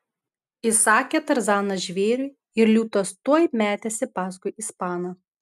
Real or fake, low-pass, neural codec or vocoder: real; 14.4 kHz; none